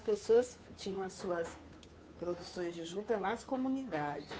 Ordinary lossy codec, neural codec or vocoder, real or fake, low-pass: none; codec, 16 kHz, 2 kbps, FunCodec, trained on Chinese and English, 25 frames a second; fake; none